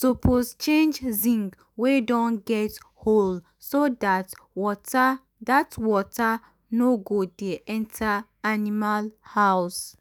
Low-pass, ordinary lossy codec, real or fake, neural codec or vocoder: none; none; fake; autoencoder, 48 kHz, 128 numbers a frame, DAC-VAE, trained on Japanese speech